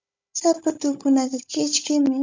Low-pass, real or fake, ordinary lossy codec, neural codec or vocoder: 7.2 kHz; fake; MP3, 64 kbps; codec, 16 kHz, 16 kbps, FunCodec, trained on Chinese and English, 50 frames a second